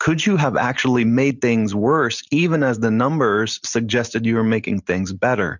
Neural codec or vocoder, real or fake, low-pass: none; real; 7.2 kHz